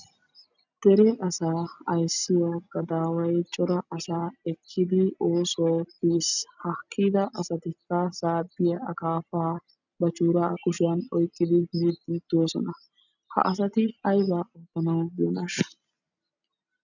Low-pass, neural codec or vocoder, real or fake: 7.2 kHz; none; real